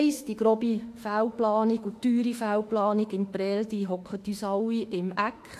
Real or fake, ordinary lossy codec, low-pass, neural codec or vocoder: fake; AAC, 64 kbps; 14.4 kHz; autoencoder, 48 kHz, 32 numbers a frame, DAC-VAE, trained on Japanese speech